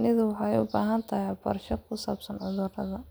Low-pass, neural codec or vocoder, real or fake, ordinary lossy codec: none; none; real; none